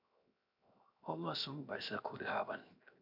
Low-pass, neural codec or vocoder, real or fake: 5.4 kHz; codec, 16 kHz, 0.7 kbps, FocalCodec; fake